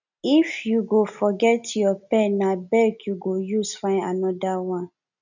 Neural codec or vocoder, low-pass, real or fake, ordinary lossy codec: none; 7.2 kHz; real; none